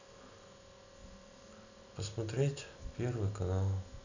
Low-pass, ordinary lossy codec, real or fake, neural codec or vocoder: 7.2 kHz; none; real; none